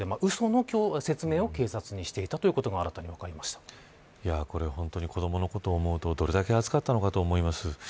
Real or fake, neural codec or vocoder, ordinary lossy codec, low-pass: real; none; none; none